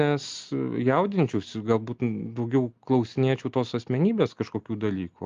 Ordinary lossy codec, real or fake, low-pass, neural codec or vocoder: Opus, 32 kbps; real; 7.2 kHz; none